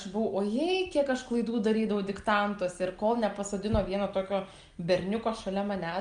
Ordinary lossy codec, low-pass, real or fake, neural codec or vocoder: Opus, 32 kbps; 9.9 kHz; real; none